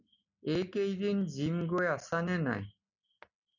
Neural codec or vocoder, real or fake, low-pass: none; real; 7.2 kHz